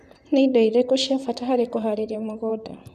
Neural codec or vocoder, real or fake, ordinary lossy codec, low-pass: vocoder, 44.1 kHz, 128 mel bands, Pupu-Vocoder; fake; none; 14.4 kHz